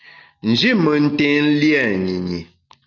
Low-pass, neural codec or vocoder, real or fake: 7.2 kHz; none; real